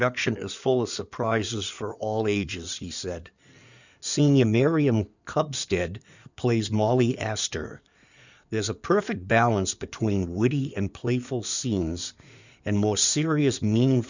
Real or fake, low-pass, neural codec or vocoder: fake; 7.2 kHz; codec, 16 kHz in and 24 kHz out, 2.2 kbps, FireRedTTS-2 codec